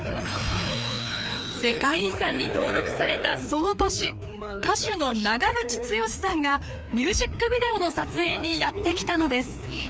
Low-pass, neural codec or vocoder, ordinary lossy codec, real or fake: none; codec, 16 kHz, 2 kbps, FreqCodec, larger model; none; fake